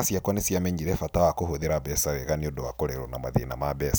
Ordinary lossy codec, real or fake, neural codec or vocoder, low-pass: none; real; none; none